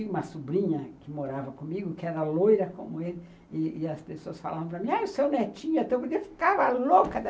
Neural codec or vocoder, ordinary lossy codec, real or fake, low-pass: none; none; real; none